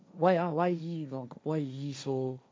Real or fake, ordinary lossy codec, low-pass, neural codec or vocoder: fake; none; none; codec, 16 kHz, 1.1 kbps, Voila-Tokenizer